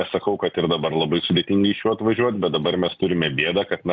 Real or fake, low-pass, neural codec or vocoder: real; 7.2 kHz; none